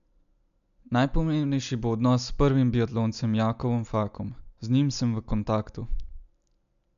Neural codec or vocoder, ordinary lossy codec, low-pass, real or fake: none; none; 7.2 kHz; real